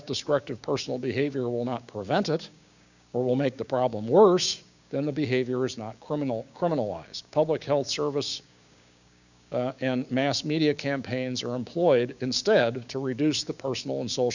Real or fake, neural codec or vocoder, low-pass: fake; codec, 16 kHz, 6 kbps, DAC; 7.2 kHz